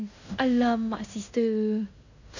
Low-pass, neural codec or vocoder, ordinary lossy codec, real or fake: 7.2 kHz; codec, 16 kHz in and 24 kHz out, 0.9 kbps, LongCat-Audio-Codec, fine tuned four codebook decoder; none; fake